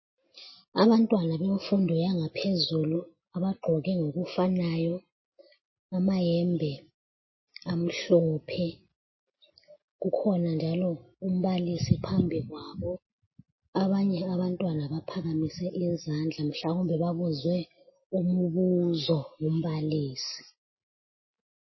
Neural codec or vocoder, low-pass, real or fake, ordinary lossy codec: none; 7.2 kHz; real; MP3, 24 kbps